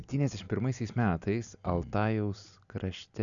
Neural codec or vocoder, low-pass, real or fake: none; 7.2 kHz; real